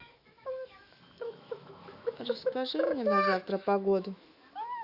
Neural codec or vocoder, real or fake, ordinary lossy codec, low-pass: autoencoder, 48 kHz, 128 numbers a frame, DAC-VAE, trained on Japanese speech; fake; Opus, 64 kbps; 5.4 kHz